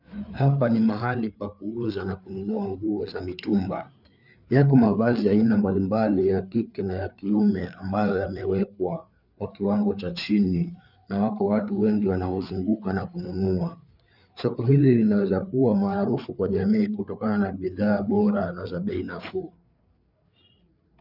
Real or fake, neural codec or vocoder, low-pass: fake; codec, 16 kHz, 4 kbps, FreqCodec, larger model; 5.4 kHz